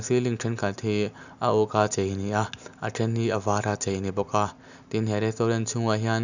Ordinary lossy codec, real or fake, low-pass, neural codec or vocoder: none; real; 7.2 kHz; none